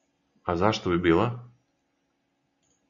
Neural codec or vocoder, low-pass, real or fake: none; 7.2 kHz; real